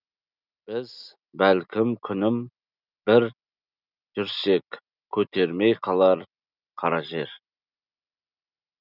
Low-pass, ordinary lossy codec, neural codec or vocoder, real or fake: 5.4 kHz; none; none; real